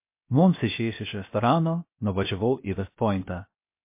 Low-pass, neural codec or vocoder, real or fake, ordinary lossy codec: 3.6 kHz; codec, 16 kHz, 0.7 kbps, FocalCodec; fake; MP3, 24 kbps